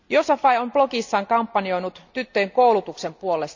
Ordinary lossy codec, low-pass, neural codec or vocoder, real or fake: Opus, 64 kbps; 7.2 kHz; none; real